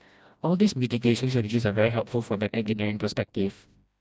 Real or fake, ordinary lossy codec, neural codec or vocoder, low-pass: fake; none; codec, 16 kHz, 1 kbps, FreqCodec, smaller model; none